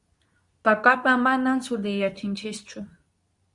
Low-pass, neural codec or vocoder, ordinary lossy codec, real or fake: 10.8 kHz; codec, 24 kHz, 0.9 kbps, WavTokenizer, medium speech release version 2; Opus, 64 kbps; fake